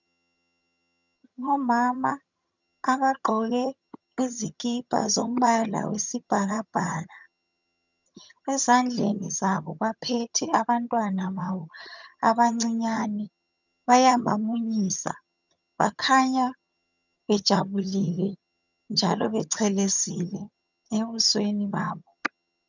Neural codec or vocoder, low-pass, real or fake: vocoder, 22.05 kHz, 80 mel bands, HiFi-GAN; 7.2 kHz; fake